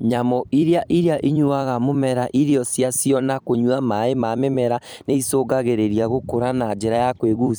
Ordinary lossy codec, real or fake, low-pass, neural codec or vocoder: none; fake; none; vocoder, 44.1 kHz, 128 mel bands every 256 samples, BigVGAN v2